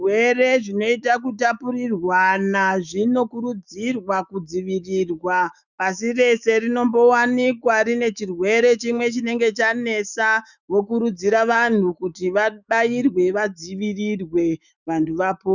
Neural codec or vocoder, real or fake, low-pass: autoencoder, 48 kHz, 128 numbers a frame, DAC-VAE, trained on Japanese speech; fake; 7.2 kHz